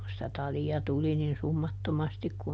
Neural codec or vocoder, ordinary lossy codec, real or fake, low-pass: none; none; real; none